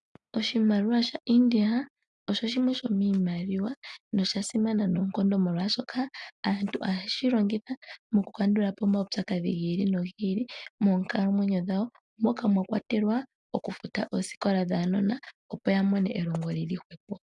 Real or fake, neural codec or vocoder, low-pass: real; none; 10.8 kHz